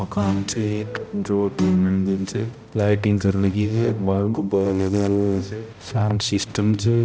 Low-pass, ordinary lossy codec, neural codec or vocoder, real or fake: none; none; codec, 16 kHz, 0.5 kbps, X-Codec, HuBERT features, trained on balanced general audio; fake